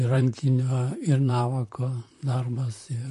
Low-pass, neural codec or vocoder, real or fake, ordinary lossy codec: 14.4 kHz; vocoder, 44.1 kHz, 128 mel bands, Pupu-Vocoder; fake; MP3, 48 kbps